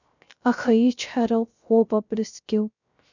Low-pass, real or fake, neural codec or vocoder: 7.2 kHz; fake; codec, 16 kHz, 0.3 kbps, FocalCodec